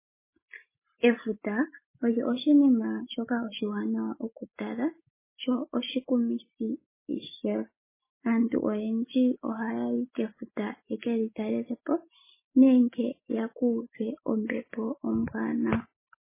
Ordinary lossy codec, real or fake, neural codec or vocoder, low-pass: MP3, 16 kbps; real; none; 3.6 kHz